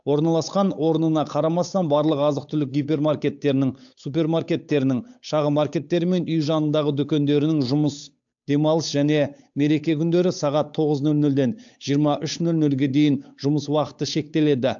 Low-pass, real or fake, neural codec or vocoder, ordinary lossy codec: 7.2 kHz; fake; codec, 16 kHz, 8 kbps, FunCodec, trained on Chinese and English, 25 frames a second; AAC, 64 kbps